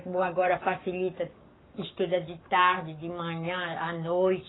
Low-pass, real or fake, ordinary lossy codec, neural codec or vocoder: 7.2 kHz; fake; AAC, 16 kbps; codec, 44.1 kHz, 7.8 kbps, Pupu-Codec